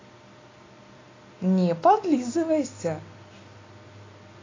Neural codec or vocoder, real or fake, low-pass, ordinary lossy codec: none; real; 7.2 kHz; AAC, 32 kbps